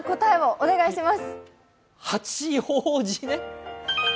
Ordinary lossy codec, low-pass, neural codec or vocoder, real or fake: none; none; none; real